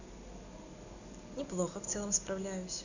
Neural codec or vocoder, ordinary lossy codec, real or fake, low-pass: none; none; real; 7.2 kHz